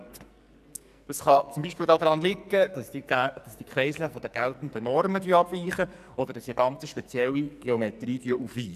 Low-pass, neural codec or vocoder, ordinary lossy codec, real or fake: 14.4 kHz; codec, 44.1 kHz, 2.6 kbps, SNAC; none; fake